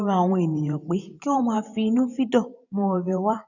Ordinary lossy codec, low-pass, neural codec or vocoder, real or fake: none; 7.2 kHz; vocoder, 44.1 kHz, 128 mel bands every 512 samples, BigVGAN v2; fake